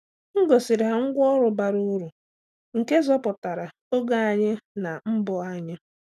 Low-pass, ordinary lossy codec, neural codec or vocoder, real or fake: 14.4 kHz; none; none; real